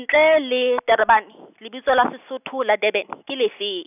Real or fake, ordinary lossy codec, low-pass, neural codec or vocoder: real; none; 3.6 kHz; none